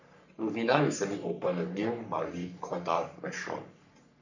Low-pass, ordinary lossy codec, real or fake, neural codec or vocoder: 7.2 kHz; none; fake; codec, 44.1 kHz, 3.4 kbps, Pupu-Codec